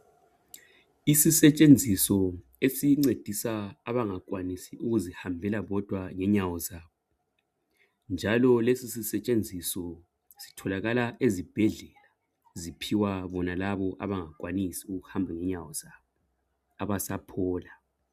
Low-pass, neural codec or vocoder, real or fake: 14.4 kHz; none; real